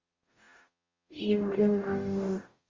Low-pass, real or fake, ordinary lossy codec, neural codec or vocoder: 7.2 kHz; fake; Opus, 32 kbps; codec, 44.1 kHz, 0.9 kbps, DAC